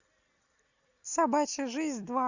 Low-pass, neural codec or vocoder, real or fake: 7.2 kHz; none; real